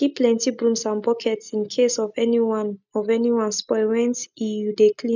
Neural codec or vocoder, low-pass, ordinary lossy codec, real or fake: none; 7.2 kHz; none; real